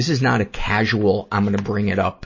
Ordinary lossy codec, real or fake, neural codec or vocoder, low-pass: MP3, 32 kbps; real; none; 7.2 kHz